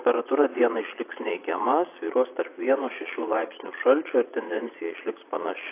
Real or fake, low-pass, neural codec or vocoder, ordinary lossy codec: fake; 3.6 kHz; vocoder, 22.05 kHz, 80 mel bands, Vocos; AAC, 24 kbps